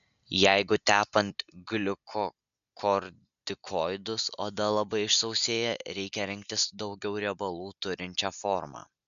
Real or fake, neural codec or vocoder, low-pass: real; none; 7.2 kHz